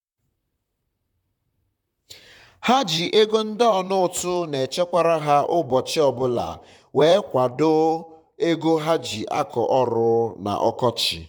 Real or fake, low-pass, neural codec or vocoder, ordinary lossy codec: fake; 19.8 kHz; vocoder, 44.1 kHz, 128 mel bands, Pupu-Vocoder; none